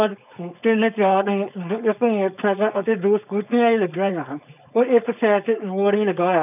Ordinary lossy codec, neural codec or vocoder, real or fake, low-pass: none; codec, 16 kHz, 4.8 kbps, FACodec; fake; 3.6 kHz